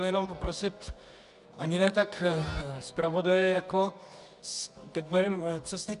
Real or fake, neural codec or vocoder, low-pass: fake; codec, 24 kHz, 0.9 kbps, WavTokenizer, medium music audio release; 10.8 kHz